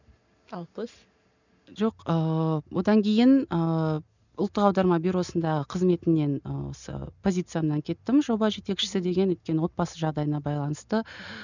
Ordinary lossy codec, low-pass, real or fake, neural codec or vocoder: none; 7.2 kHz; real; none